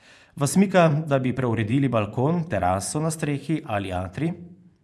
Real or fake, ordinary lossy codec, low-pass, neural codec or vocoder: fake; none; none; vocoder, 24 kHz, 100 mel bands, Vocos